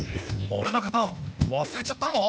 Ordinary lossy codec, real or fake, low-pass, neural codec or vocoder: none; fake; none; codec, 16 kHz, 0.8 kbps, ZipCodec